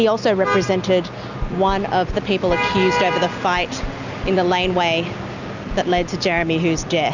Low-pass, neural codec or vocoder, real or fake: 7.2 kHz; none; real